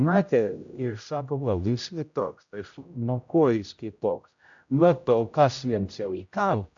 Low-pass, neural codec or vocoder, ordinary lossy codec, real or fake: 7.2 kHz; codec, 16 kHz, 0.5 kbps, X-Codec, HuBERT features, trained on general audio; AAC, 64 kbps; fake